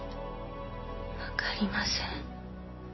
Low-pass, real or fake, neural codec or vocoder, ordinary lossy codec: 7.2 kHz; real; none; MP3, 24 kbps